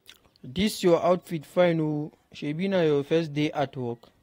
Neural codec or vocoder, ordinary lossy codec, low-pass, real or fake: vocoder, 44.1 kHz, 128 mel bands every 512 samples, BigVGAN v2; AAC, 48 kbps; 19.8 kHz; fake